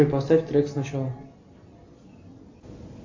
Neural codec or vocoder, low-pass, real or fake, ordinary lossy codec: none; 7.2 kHz; real; AAC, 48 kbps